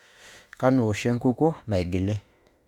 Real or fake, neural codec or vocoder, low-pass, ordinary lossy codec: fake; autoencoder, 48 kHz, 32 numbers a frame, DAC-VAE, trained on Japanese speech; 19.8 kHz; Opus, 64 kbps